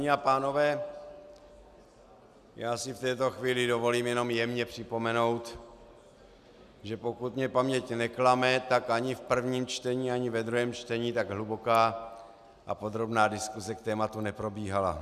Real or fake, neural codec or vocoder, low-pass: real; none; 14.4 kHz